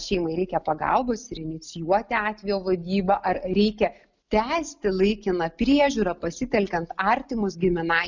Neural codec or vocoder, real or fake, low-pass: none; real; 7.2 kHz